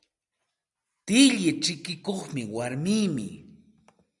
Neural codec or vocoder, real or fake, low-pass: none; real; 10.8 kHz